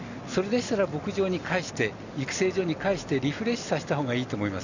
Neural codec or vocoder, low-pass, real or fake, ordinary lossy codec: none; 7.2 kHz; real; none